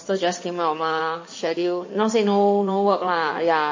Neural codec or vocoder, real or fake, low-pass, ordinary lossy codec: codec, 16 kHz in and 24 kHz out, 2.2 kbps, FireRedTTS-2 codec; fake; 7.2 kHz; MP3, 32 kbps